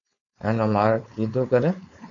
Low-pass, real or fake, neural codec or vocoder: 7.2 kHz; fake; codec, 16 kHz, 4.8 kbps, FACodec